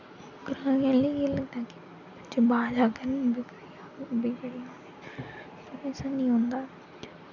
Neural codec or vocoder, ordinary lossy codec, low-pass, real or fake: none; none; 7.2 kHz; real